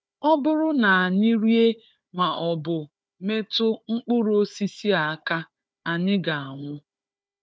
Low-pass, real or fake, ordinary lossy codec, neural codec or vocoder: none; fake; none; codec, 16 kHz, 4 kbps, FunCodec, trained on Chinese and English, 50 frames a second